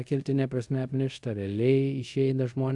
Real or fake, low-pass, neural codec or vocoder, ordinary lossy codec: fake; 10.8 kHz; codec, 24 kHz, 0.5 kbps, DualCodec; AAC, 64 kbps